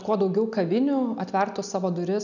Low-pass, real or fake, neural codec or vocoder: 7.2 kHz; real; none